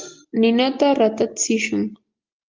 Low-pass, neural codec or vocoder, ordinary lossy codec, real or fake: 7.2 kHz; none; Opus, 32 kbps; real